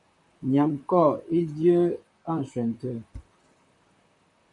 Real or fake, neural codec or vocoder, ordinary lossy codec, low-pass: fake; vocoder, 44.1 kHz, 128 mel bands, Pupu-Vocoder; MP3, 96 kbps; 10.8 kHz